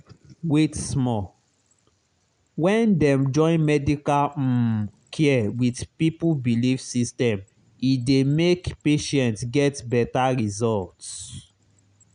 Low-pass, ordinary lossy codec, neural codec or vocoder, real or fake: 9.9 kHz; none; none; real